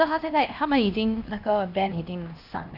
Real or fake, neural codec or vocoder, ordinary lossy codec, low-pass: fake; codec, 16 kHz, 1 kbps, X-Codec, HuBERT features, trained on LibriSpeech; none; 5.4 kHz